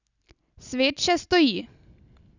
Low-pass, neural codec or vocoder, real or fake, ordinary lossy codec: 7.2 kHz; none; real; none